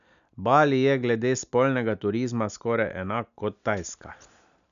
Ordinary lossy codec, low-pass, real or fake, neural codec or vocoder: none; 7.2 kHz; real; none